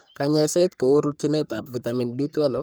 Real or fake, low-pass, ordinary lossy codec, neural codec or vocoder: fake; none; none; codec, 44.1 kHz, 3.4 kbps, Pupu-Codec